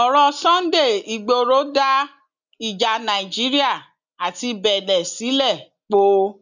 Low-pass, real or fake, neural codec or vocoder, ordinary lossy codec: 7.2 kHz; real; none; none